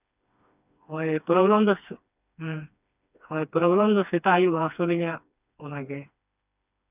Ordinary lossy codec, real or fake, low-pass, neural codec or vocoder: none; fake; 3.6 kHz; codec, 16 kHz, 2 kbps, FreqCodec, smaller model